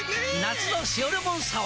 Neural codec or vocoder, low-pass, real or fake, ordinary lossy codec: none; none; real; none